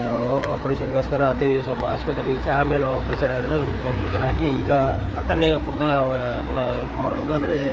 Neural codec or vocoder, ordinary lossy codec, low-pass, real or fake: codec, 16 kHz, 4 kbps, FreqCodec, larger model; none; none; fake